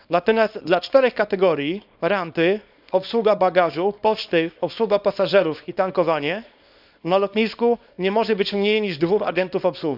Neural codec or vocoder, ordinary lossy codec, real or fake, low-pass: codec, 24 kHz, 0.9 kbps, WavTokenizer, small release; none; fake; 5.4 kHz